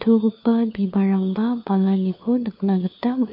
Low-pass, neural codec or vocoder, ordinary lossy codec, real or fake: 5.4 kHz; codec, 16 kHz, 4 kbps, FunCodec, trained on LibriTTS, 50 frames a second; MP3, 32 kbps; fake